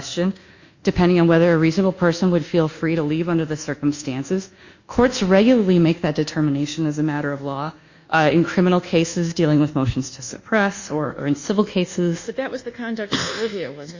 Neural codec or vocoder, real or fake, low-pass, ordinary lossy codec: codec, 24 kHz, 1.2 kbps, DualCodec; fake; 7.2 kHz; Opus, 64 kbps